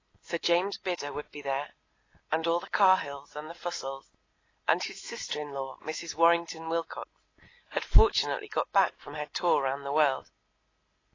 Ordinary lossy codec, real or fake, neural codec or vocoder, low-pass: AAC, 32 kbps; real; none; 7.2 kHz